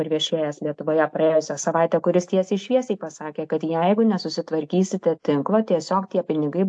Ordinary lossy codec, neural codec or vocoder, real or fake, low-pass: AAC, 64 kbps; none; real; 9.9 kHz